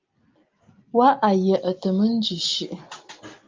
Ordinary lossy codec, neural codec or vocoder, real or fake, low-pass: Opus, 24 kbps; none; real; 7.2 kHz